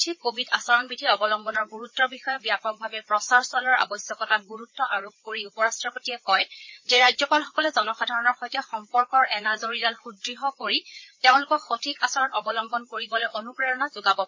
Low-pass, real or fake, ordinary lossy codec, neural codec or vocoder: 7.2 kHz; fake; MP3, 32 kbps; codec, 16 kHz, 4 kbps, FreqCodec, larger model